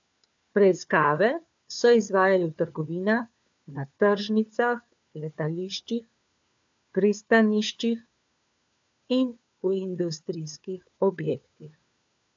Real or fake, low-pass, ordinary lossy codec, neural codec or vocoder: fake; 7.2 kHz; AAC, 64 kbps; codec, 16 kHz, 4 kbps, FunCodec, trained on LibriTTS, 50 frames a second